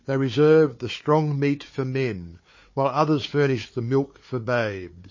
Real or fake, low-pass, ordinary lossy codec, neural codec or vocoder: fake; 7.2 kHz; MP3, 32 kbps; codec, 16 kHz, 4 kbps, FunCodec, trained on Chinese and English, 50 frames a second